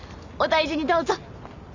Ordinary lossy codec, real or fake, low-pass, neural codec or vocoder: none; real; 7.2 kHz; none